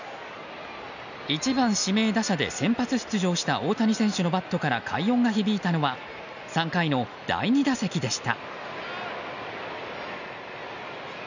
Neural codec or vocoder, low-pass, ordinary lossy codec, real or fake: none; 7.2 kHz; none; real